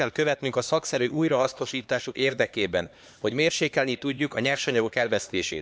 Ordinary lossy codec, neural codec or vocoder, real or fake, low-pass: none; codec, 16 kHz, 2 kbps, X-Codec, HuBERT features, trained on LibriSpeech; fake; none